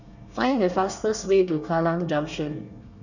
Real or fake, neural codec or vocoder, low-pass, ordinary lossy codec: fake; codec, 24 kHz, 1 kbps, SNAC; 7.2 kHz; none